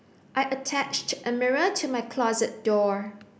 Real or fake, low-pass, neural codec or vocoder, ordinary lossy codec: real; none; none; none